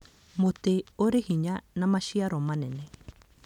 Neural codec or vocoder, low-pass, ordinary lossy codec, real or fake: none; 19.8 kHz; none; real